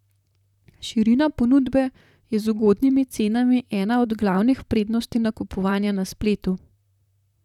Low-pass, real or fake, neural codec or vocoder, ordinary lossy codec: 19.8 kHz; fake; vocoder, 44.1 kHz, 128 mel bands, Pupu-Vocoder; none